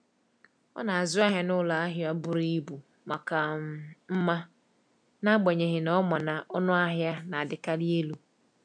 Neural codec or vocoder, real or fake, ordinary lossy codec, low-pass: none; real; MP3, 96 kbps; 9.9 kHz